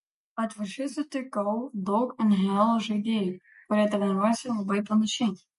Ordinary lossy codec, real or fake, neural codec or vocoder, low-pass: MP3, 48 kbps; real; none; 14.4 kHz